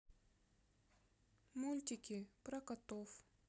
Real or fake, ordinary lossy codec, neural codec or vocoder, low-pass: real; none; none; none